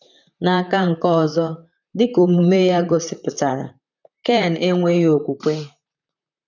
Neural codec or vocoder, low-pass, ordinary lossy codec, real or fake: vocoder, 44.1 kHz, 128 mel bands, Pupu-Vocoder; 7.2 kHz; none; fake